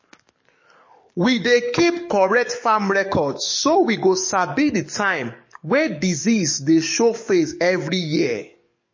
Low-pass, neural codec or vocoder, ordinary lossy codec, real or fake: 7.2 kHz; codec, 44.1 kHz, 7.8 kbps, DAC; MP3, 32 kbps; fake